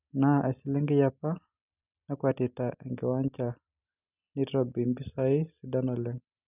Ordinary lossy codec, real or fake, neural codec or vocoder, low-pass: none; real; none; 3.6 kHz